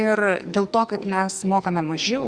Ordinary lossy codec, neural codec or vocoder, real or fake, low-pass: Opus, 64 kbps; codec, 44.1 kHz, 2.6 kbps, SNAC; fake; 9.9 kHz